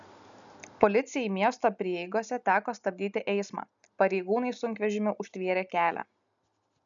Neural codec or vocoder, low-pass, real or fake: none; 7.2 kHz; real